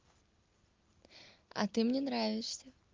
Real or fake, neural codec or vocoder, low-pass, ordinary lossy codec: real; none; 7.2 kHz; Opus, 32 kbps